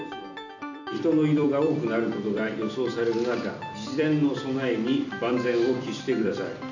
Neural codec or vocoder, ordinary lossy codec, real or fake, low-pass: vocoder, 44.1 kHz, 128 mel bands every 256 samples, BigVGAN v2; none; fake; 7.2 kHz